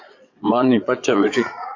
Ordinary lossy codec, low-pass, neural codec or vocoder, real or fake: AAC, 48 kbps; 7.2 kHz; vocoder, 22.05 kHz, 80 mel bands, Vocos; fake